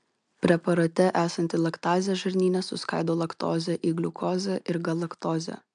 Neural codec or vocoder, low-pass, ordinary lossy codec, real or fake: none; 9.9 kHz; MP3, 96 kbps; real